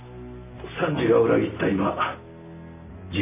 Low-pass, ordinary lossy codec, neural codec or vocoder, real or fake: 3.6 kHz; none; none; real